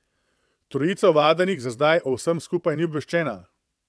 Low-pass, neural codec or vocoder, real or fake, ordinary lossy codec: none; vocoder, 22.05 kHz, 80 mel bands, WaveNeXt; fake; none